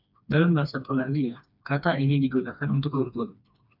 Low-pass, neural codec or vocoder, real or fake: 5.4 kHz; codec, 16 kHz, 2 kbps, FreqCodec, smaller model; fake